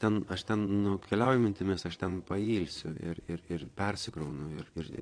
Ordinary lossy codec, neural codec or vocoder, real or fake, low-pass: AAC, 48 kbps; vocoder, 22.05 kHz, 80 mel bands, WaveNeXt; fake; 9.9 kHz